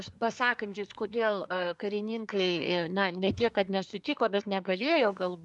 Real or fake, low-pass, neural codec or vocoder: fake; 10.8 kHz; codec, 24 kHz, 1 kbps, SNAC